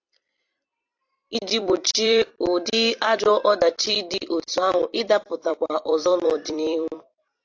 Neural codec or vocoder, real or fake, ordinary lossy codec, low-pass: vocoder, 44.1 kHz, 128 mel bands every 256 samples, BigVGAN v2; fake; AAC, 48 kbps; 7.2 kHz